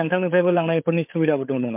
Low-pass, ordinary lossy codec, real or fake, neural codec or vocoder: 3.6 kHz; MP3, 32 kbps; fake; codec, 16 kHz, 8 kbps, FreqCodec, larger model